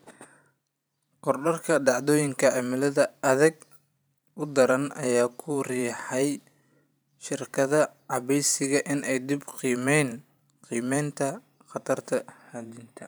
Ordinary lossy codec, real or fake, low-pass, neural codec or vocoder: none; fake; none; vocoder, 44.1 kHz, 128 mel bands every 512 samples, BigVGAN v2